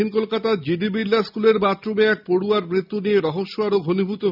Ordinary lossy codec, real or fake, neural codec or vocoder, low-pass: none; real; none; 5.4 kHz